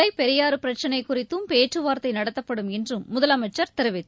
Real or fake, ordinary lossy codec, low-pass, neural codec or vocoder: real; none; none; none